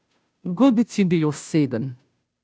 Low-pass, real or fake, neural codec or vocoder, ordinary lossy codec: none; fake; codec, 16 kHz, 0.5 kbps, FunCodec, trained on Chinese and English, 25 frames a second; none